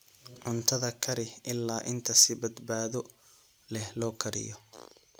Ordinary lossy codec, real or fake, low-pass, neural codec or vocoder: none; real; none; none